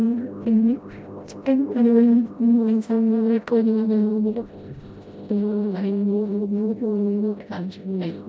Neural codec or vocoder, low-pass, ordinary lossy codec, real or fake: codec, 16 kHz, 0.5 kbps, FreqCodec, smaller model; none; none; fake